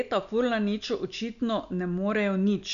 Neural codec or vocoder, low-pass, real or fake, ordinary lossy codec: none; 7.2 kHz; real; none